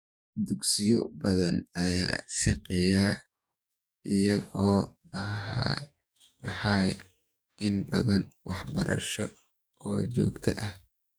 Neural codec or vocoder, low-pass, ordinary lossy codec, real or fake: codec, 44.1 kHz, 2.6 kbps, DAC; none; none; fake